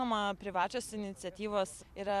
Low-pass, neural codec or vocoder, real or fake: 14.4 kHz; vocoder, 44.1 kHz, 128 mel bands every 256 samples, BigVGAN v2; fake